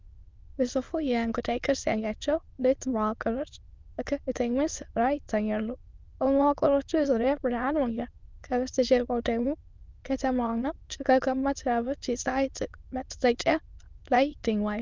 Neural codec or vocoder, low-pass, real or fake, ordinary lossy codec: autoencoder, 22.05 kHz, a latent of 192 numbers a frame, VITS, trained on many speakers; 7.2 kHz; fake; Opus, 24 kbps